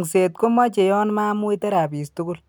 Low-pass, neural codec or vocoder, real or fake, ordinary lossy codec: none; none; real; none